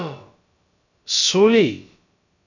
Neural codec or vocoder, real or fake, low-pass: codec, 16 kHz, about 1 kbps, DyCAST, with the encoder's durations; fake; 7.2 kHz